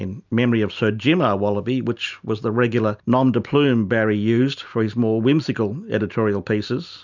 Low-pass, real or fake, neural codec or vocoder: 7.2 kHz; real; none